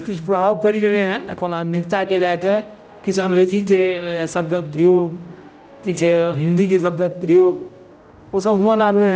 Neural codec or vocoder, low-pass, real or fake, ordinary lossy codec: codec, 16 kHz, 0.5 kbps, X-Codec, HuBERT features, trained on general audio; none; fake; none